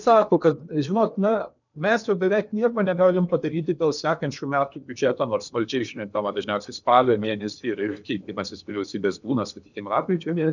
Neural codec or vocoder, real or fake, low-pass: codec, 16 kHz in and 24 kHz out, 0.8 kbps, FocalCodec, streaming, 65536 codes; fake; 7.2 kHz